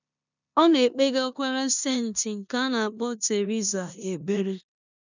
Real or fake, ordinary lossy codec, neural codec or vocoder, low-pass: fake; none; codec, 16 kHz in and 24 kHz out, 0.9 kbps, LongCat-Audio-Codec, four codebook decoder; 7.2 kHz